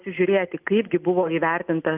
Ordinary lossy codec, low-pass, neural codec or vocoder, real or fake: Opus, 24 kbps; 3.6 kHz; none; real